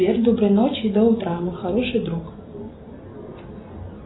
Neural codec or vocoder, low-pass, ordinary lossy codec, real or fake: none; 7.2 kHz; AAC, 16 kbps; real